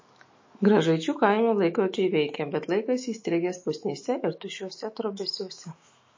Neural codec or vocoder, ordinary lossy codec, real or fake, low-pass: autoencoder, 48 kHz, 128 numbers a frame, DAC-VAE, trained on Japanese speech; MP3, 32 kbps; fake; 7.2 kHz